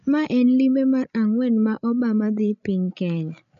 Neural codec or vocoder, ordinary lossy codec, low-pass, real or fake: codec, 16 kHz, 16 kbps, FreqCodec, larger model; none; 7.2 kHz; fake